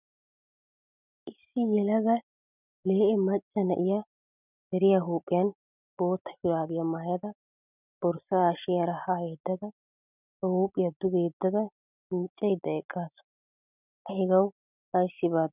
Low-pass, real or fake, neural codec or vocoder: 3.6 kHz; real; none